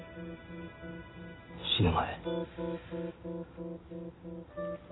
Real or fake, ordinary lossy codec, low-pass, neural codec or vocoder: real; AAC, 16 kbps; 7.2 kHz; none